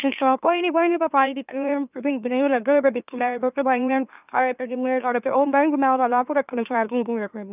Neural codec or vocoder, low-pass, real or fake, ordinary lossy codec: autoencoder, 44.1 kHz, a latent of 192 numbers a frame, MeloTTS; 3.6 kHz; fake; none